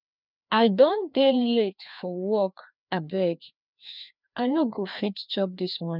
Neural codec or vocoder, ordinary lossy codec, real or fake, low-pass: codec, 16 kHz, 2 kbps, FreqCodec, larger model; none; fake; 5.4 kHz